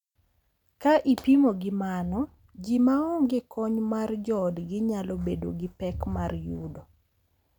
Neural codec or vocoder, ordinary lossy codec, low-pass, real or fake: none; none; 19.8 kHz; real